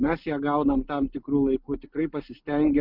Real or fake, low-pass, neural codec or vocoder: real; 5.4 kHz; none